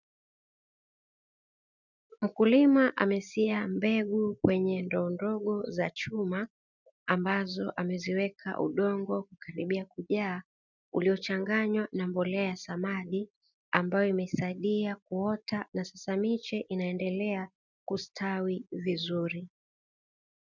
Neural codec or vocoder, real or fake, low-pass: none; real; 7.2 kHz